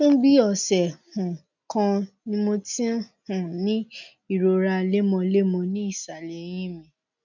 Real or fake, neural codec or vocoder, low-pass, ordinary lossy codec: real; none; 7.2 kHz; none